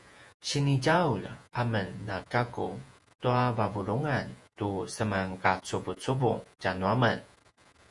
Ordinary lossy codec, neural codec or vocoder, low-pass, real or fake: Opus, 64 kbps; vocoder, 48 kHz, 128 mel bands, Vocos; 10.8 kHz; fake